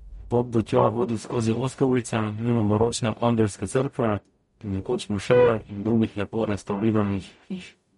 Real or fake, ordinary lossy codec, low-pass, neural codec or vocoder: fake; MP3, 48 kbps; 19.8 kHz; codec, 44.1 kHz, 0.9 kbps, DAC